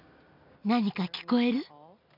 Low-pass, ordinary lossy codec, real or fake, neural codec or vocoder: 5.4 kHz; none; real; none